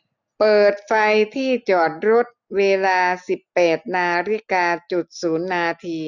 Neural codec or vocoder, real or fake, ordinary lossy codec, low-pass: none; real; none; 7.2 kHz